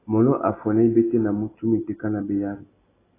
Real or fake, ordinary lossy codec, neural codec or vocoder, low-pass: real; AAC, 16 kbps; none; 3.6 kHz